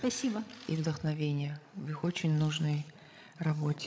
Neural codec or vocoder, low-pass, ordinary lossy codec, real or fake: codec, 16 kHz, 16 kbps, FreqCodec, larger model; none; none; fake